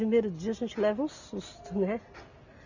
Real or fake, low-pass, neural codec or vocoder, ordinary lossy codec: real; 7.2 kHz; none; none